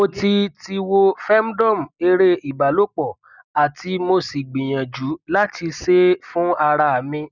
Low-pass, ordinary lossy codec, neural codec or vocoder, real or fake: 7.2 kHz; none; none; real